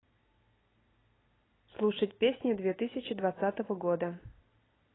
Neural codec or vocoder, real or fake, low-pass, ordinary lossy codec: none; real; 7.2 kHz; AAC, 16 kbps